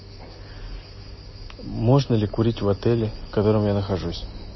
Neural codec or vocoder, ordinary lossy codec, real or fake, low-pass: none; MP3, 24 kbps; real; 7.2 kHz